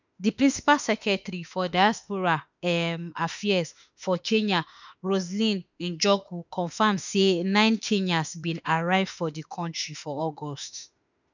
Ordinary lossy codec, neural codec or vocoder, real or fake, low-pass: none; autoencoder, 48 kHz, 32 numbers a frame, DAC-VAE, trained on Japanese speech; fake; 7.2 kHz